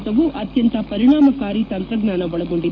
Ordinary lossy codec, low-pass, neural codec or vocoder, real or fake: none; 7.2 kHz; codec, 44.1 kHz, 7.8 kbps, DAC; fake